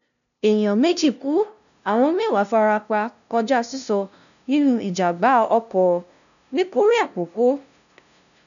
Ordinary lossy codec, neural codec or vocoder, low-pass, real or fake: none; codec, 16 kHz, 0.5 kbps, FunCodec, trained on LibriTTS, 25 frames a second; 7.2 kHz; fake